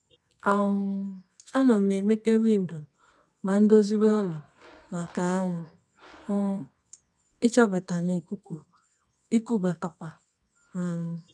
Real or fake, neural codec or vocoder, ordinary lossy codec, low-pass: fake; codec, 24 kHz, 0.9 kbps, WavTokenizer, medium music audio release; none; none